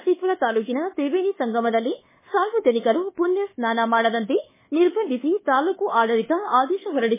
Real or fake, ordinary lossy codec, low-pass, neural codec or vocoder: fake; MP3, 16 kbps; 3.6 kHz; codec, 24 kHz, 1.2 kbps, DualCodec